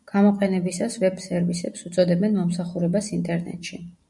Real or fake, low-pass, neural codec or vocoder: real; 10.8 kHz; none